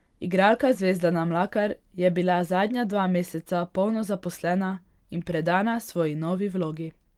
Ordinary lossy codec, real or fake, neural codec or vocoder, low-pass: Opus, 24 kbps; fake; vocoder, 44.1 kHz, 128 mel bands every 512 samples, BigVGAN v2; 19.8 kHz